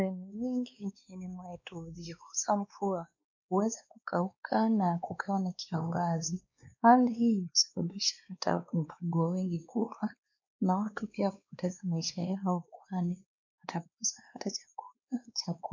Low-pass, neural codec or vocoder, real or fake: 7.2 kHz; codec, 16 kHz, 2 kbps, X-Codec, WavLM features, trained on Multilingual LibriSpeech; fake